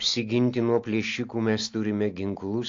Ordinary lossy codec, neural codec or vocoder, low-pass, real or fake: AAC, 48 kbps; none; 7.2 kHz; real